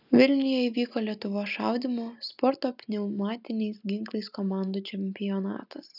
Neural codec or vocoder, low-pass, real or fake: none; 5.4 kHz; real